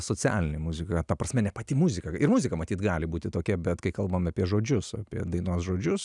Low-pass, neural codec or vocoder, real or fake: 10.8 kHz; none; real